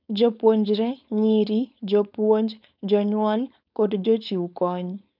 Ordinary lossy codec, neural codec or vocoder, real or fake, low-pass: none; codec, 16 kHz, 4.8 kbps, FACodec; fake; 5.4 kHz